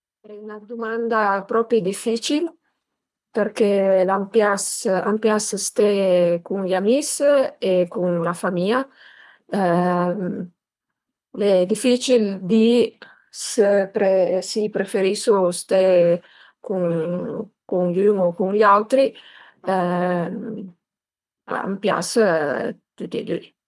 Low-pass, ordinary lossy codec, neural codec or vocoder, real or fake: none; none; codec, 24 kHz, 3 kbps, HILCodec; fake